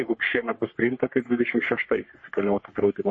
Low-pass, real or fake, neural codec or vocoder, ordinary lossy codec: 9.9 kHz; fake; codec, 44.1 kHz, 3.4 kbps, Pupu-Codec; MP3, 32 kbps